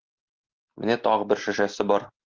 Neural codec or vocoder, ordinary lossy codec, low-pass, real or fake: none; Opus, 16 kbps; 7.2 kHz; real